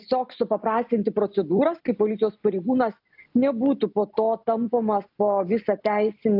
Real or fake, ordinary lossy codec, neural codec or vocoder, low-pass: real; AAC, 48 kbps; none; 5.4 kHz